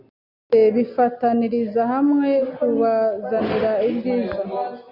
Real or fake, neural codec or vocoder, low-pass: real; none; 5.4 kHz